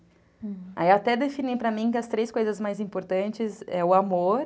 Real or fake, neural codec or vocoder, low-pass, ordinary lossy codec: real; none; none; none